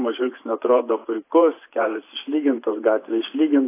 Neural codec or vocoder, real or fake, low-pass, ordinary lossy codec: vocoder, 24 kHz, 100 mel bands, Vocos; fake; 3.6 kHz; AAC, 24 kbps